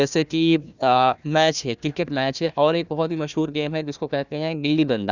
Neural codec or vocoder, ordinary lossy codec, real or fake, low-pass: codec, 16 kHz, 1 kbps, FunCodec, trained on Chinese and English, 50 frames a second; none; fake; 7.2 kHz